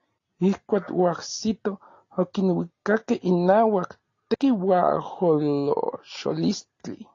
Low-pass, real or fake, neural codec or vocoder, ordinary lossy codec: 7.2 kHz; real; none; AAC, 32 kbps